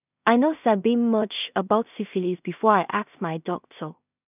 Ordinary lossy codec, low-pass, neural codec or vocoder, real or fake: none; 3.6 kHz; codec, 16 kHz in and 24 kHz out, 0.4 kbps, LongCat-Audio-Codec, two codebook decoder; fake